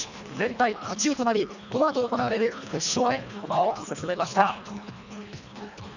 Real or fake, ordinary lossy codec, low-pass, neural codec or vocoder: fake; none; 7.2 kHz; codec, 24 kHz, 1.5 kbps, HILCodec